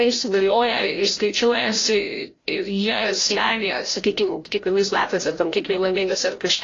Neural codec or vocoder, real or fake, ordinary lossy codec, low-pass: codec, 16 kHz, 0.5 kbps, FreqCodec, larger model; fake; AAC, 32 kbps; 7.2 kHz